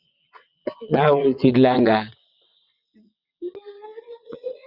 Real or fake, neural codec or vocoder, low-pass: fake; vocoder, 22.05 kHz, 80 mel bands, WaveNeXt; 5.4 kHz